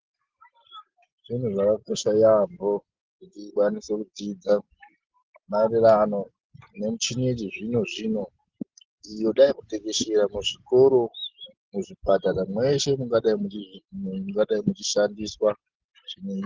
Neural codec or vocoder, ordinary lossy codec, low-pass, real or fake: none; Opus, 16 kbps; 7.2 kHz; real